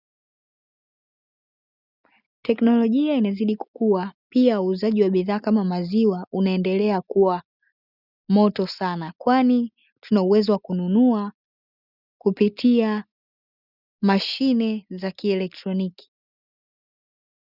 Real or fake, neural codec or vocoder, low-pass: real; none; 5.4 kHz